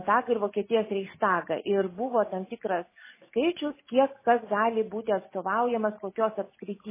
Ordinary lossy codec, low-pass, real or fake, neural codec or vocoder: MP3, 16 kbps; 3.6 kHz; real; none